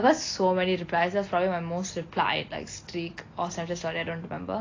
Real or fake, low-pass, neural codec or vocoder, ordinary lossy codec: real; 7.2 kHz; none; AAC, 32 kbps